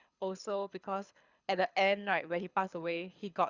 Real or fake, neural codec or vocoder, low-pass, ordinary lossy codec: fake; codec, 24 kHz, 6 kbps, HILCodec; 7.2 kHz; Opus, 64 kbps